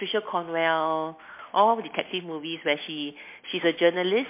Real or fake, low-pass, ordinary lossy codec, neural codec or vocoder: real; 3.6 kHz; MP3, 24 kbps; none